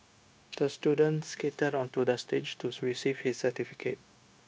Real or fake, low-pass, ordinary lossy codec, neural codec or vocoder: fake; none; none; codec, 16 kHz, 0.9 kbps, LongCat-Audio-Codec